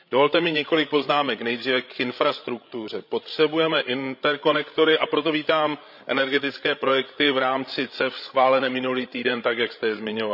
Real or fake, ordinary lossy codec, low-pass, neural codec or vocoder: fake; none; 5.4 kHz; codec, 16 kHz, 16 kbps, FreqCodec, larger model